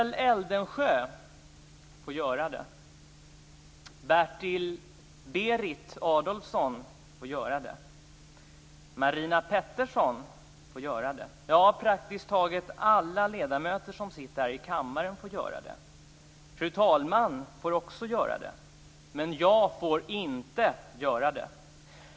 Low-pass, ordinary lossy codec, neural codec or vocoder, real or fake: none; none; none; real